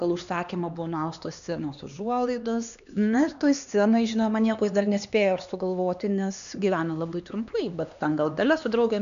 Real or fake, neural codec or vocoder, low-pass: fake; codec, 16 kHz, 2 kbps, X-Codec, HuBERT features, trained on LibriSpeech; 7.2 kHz